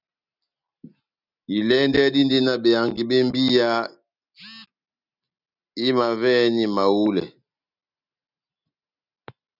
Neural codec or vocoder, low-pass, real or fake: none; 5.4 kHz; real